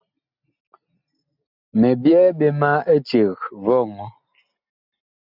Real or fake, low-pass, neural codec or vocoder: real; 5.4 kHz; none